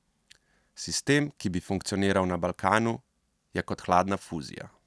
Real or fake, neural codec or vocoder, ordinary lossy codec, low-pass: real; none; none; none